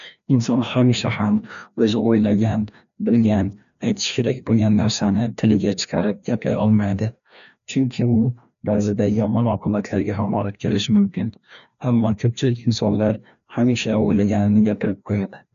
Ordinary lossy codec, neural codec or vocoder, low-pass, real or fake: none; codec, 16 kHz, 1 kbps, FreqCodec, larger model; 7.2 kHz; fake